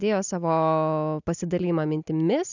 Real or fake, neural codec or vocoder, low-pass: real; none; 7.2 kHz